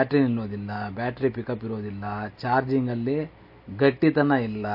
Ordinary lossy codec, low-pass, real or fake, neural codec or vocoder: MP3, 32 kbps; 5.4 kHz; real; none